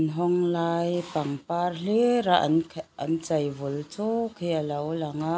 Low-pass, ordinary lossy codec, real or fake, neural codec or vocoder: none; none; real; none